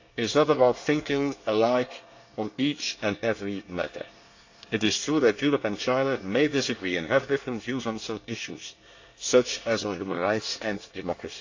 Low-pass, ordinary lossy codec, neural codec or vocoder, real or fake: 7.2 kHz; AAC, 48 kbps; codec, 24 kHz, 1 kbps, SNAC; fake